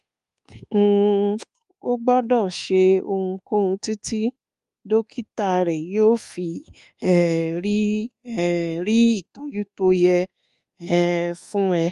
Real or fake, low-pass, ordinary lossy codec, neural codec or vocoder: fake; 10.8 kHz; Opus, 32 kbps; codec, 24 kHz, 1.2 kbps, DualCodec